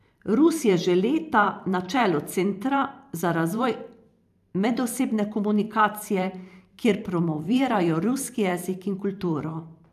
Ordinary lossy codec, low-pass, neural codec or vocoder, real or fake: AAC, 96 kbps; 14.4 kHz; vocoder, 44.1 kHz, 128 mel bands every 512 samples, BigVGAN v2; fake